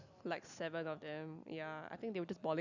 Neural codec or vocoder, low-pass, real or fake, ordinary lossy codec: none; 7.2 kHz; real; none